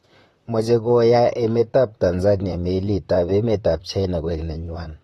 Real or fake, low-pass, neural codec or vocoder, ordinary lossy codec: fake; 19.8 kHz; vocoder, 44.1 kHz, 128 mel bands, Pupu-Vocoder; AAC, 32 kbps